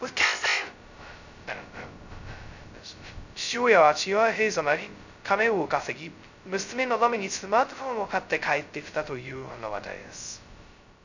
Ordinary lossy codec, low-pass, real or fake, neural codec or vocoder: none; 7.2 kHz; fake; codec, 16 kHz, 0.2 kbps, FocalCodec